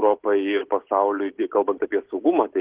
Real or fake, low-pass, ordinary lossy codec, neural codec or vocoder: real; 3.6 kHz; Opus, 16 kbps; none